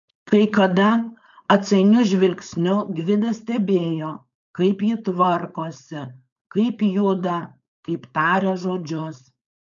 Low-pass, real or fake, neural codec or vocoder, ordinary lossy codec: 7.2 kHz; fake; codec, 16 kHz, 4.8 kbps, FACodec; MP3, 96 kbps